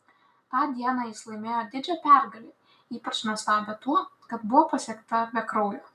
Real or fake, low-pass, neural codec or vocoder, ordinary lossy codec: real; 14.4 kHz; none; MP3, 96 kbps